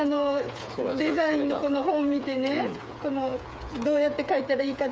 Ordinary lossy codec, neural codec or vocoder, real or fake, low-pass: none; codec, 16 kHz, 8 kbps, FreqCodec, smaller model; fake; none